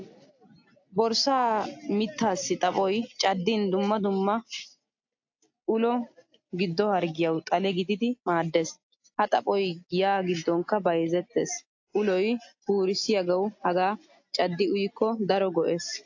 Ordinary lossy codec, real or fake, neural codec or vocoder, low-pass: AAC, 48 kbps; real; none; 7.2 kHz